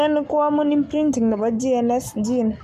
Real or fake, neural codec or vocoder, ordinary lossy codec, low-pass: fake; codec, 44.1 kHz, 7.8 kbps, Pupu-Codec; none; 14.4 kHz